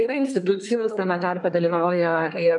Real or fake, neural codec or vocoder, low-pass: fake; codec, 24 kHz, 1 kbps, SNAC; 10.8 kHz